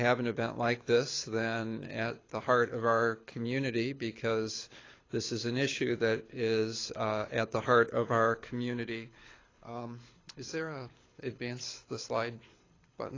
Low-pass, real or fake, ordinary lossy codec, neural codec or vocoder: 7.2 kHz; fake; AAC, 32 kbps; codec, 24 kHz, 6 kbps, HILCodec